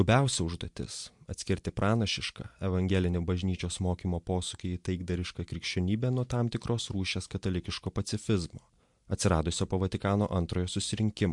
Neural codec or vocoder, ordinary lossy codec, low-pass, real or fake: none; MP3, 64 kbps; 10.8 kHz; real